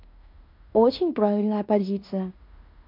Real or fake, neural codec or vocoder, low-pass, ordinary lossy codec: fake; codec, 16 kHz in and 24 kHz out, 0.9 kbps, LongCat-Audio-Codec, fine tuned four codebook decoder; 5.4 kHz; MP3, 48 kbps